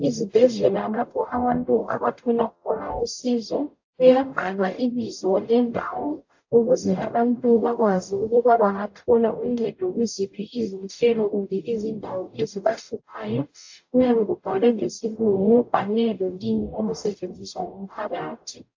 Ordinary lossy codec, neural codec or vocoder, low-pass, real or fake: AAC, 48 kbps; codec, 44.1 kHz, 0.9 kbps, DAC; 7.2 kHz; fake